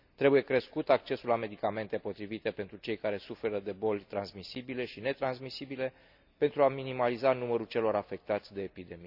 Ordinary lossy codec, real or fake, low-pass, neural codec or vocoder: none; real; 5.4 kHz; none